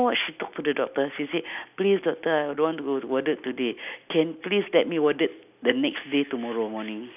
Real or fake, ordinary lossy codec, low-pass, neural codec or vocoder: real; none; 3.6 kHz; none